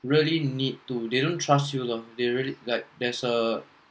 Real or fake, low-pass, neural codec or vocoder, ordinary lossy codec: real; none; none; none